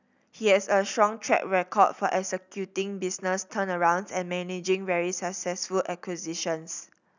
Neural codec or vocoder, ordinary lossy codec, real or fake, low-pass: none; none; real; 7.2 kHz